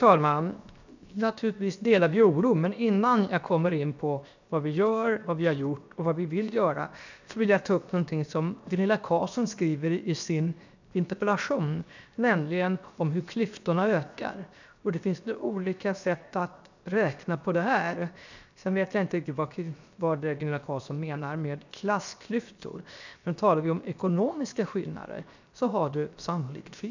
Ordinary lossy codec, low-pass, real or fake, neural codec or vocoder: none; 7.2 kHz; fake; codec, 16 kHz, 0.7 kbps, FocalCodec